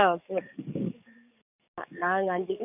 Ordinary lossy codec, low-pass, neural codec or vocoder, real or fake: none; 3.6 kHz; codec, 44.1 kHz, 7.8 kbps, DAC; fake